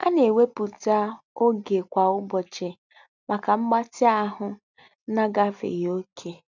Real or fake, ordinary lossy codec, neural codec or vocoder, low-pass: real; none; none; 7.2 kHz